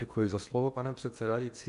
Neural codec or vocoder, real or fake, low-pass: codec, 16 kHz in and 24 kHz out, 0.8 kbps, FocalCodec, streaming, 65536 codes; fake; 10.8 kHz